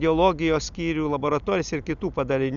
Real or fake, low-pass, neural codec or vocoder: real; 7.2 kHz; none